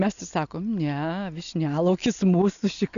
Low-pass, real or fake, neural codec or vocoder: 7.2 kHz; real; none